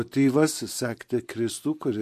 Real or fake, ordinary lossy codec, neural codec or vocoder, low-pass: fake; MP3, 64 kbps; vocoder, 44.1 kHz, 128 mel bands every 512 samples, BigVGAN v2; 14.4 kHz